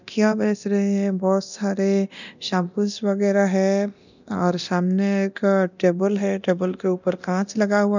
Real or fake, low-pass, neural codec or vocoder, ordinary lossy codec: fake; 7.2 kHz; codec, 24 kHz, 0.9 kbps, DualCodec; none